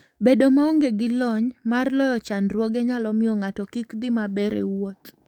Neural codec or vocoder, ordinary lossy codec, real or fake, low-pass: codec, 44.1 kHz, 7.8 kbps, DAC; none; fake; 19.8 kHz